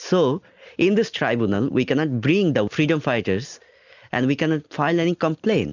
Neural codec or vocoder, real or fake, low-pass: none; real; 7.2 kHz